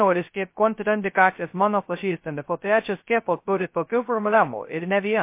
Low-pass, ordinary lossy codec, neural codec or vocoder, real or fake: 3.6 kHz; MP3, 24 kbps; codec, 16 kHz, 0.2 kbps, FocalCodec; fake